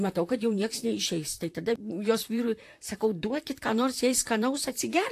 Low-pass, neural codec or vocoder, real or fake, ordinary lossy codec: 14.4 kHz; vocoder, 44.1 kHz, 128 mel bands, Pupu-Vocoder; fake; AAC, 64 kbps